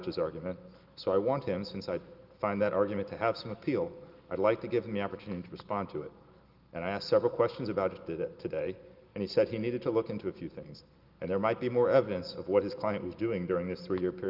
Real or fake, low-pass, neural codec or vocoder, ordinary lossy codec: real; 5.4 kHz; none; Opus, 32 kbps